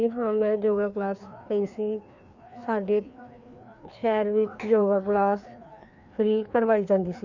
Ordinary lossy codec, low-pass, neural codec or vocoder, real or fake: none; 7.2 kHz; codec, 16 kHz, 2 kbps, FreqCodec, larger model; fake